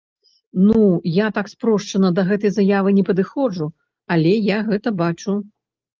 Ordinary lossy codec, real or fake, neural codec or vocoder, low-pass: Opus, 24 kbps; real; none; 7.2 kHz